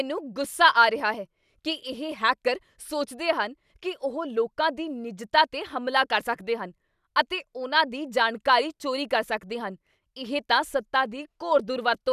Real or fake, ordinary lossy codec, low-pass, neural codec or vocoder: real; none; 14.4 kHz; none